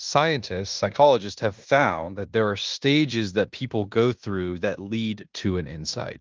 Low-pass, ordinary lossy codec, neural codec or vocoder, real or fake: 7.2 kHz; Opus, 32 kbps; codec, 16 kHz in and 24 kHz out, 0.9 kbps, LongCat-Audio-Codec, fine tuned four codebook decoder; fake